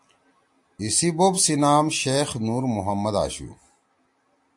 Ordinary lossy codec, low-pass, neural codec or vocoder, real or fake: AAC, 64 kbps; 10.8 kHz; none; real